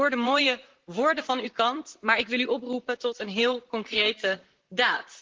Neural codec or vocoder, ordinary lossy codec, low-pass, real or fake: vocoder, 44.1 kHz, 128 mel bands, Pupu-Vocoder; Opus, 16 kbps; 7.2 kHz; fake